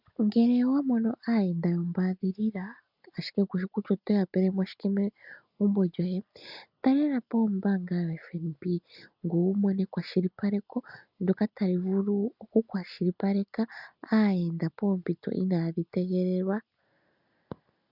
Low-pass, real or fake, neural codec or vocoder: 5.4 kHz; real; none